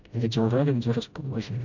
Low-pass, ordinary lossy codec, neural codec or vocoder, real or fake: 7.2 kHz; none; codec, 16 kHz, 0.5 kbps, FreqCodec, smaller model; fake